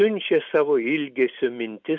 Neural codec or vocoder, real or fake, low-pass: none; real; 7.2 kHz